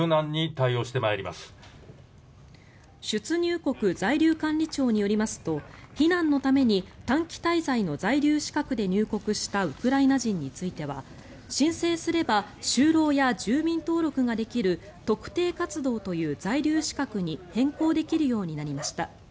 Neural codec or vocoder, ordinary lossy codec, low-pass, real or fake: none; none; none; real